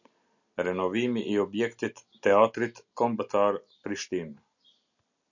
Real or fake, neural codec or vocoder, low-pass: real; none; 7.2 kHz